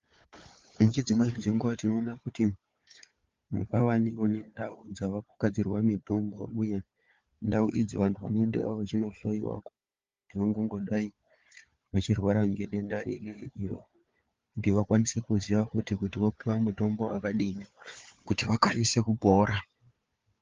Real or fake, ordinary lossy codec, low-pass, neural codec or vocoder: fake; Opus, 32 kbps; 7.2 kHz; codec, 16 kHz, 4 kbps, FunCodec, trained on Chinese and English, 50 frames a second